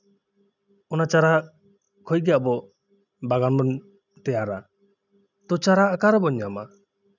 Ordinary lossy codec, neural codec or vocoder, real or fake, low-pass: none; none; real; 7.2 kHz